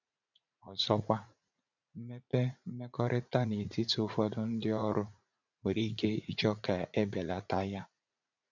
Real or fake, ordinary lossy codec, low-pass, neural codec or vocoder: fake; none; 7.2 kHz; vocoder, 44.1 kHz, 80 mel bands, Vocos